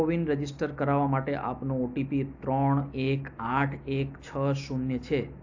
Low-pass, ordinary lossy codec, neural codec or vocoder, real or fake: 7.2 kHz; AAC, 48 kbps; none; real